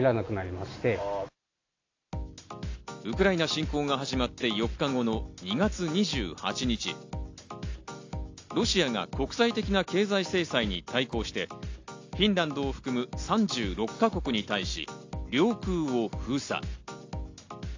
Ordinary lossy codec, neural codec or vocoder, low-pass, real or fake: AAC, 48 kbps; none; 7.2 kHz; real